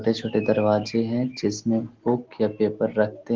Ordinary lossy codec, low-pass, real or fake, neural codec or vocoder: Opus, 16 kbps; 7.2 kHz; real; none